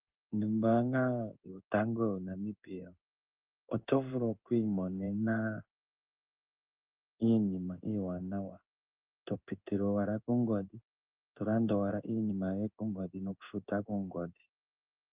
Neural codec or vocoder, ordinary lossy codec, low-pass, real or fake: codec, 16 kHz in and 24 kHz out, 1 kbps, XY-Tokenizer; Opus, 32 kbps; 3.6 kHz; fake